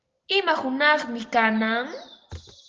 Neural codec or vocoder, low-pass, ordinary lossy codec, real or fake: none; 7.2 kHz; Opus, 16 kbps; real